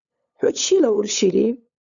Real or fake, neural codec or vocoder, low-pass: fake; codec, 16 kHz, 8 kbps, FunCodec, trained on LibriTTS, 25 frames a second; 7.2 kHz